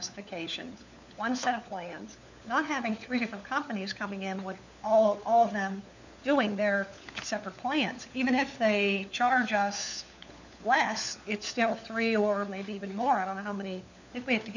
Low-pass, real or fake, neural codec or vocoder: 7.2 kHz; fake; codec, 16 kHz, 4 kbps, FunCodec, trained on LibriTTS, 50 frames a second